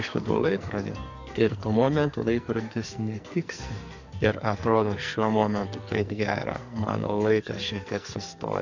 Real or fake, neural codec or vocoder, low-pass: fake; codec, 44.1 kHz, 2.6 kbps, SNAC; 7.2 kHz